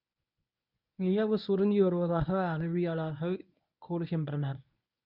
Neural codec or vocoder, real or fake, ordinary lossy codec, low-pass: codec, 24 kHz, 0.9 kbps, WavTokenizer, medium speech release version 1; fake; none; 5.4 kHz